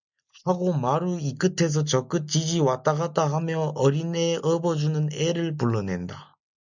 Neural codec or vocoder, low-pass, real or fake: none; 7.2 kHz; real